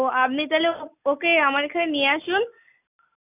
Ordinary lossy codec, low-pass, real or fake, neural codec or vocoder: none; 3.6 kHz; real; none